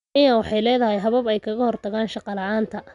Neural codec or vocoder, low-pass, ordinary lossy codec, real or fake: none; 10.8 kHz; none; real